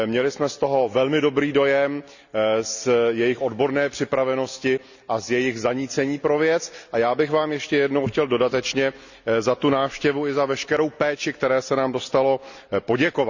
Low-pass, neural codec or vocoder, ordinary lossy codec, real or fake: 7.2 kHz; none; none; real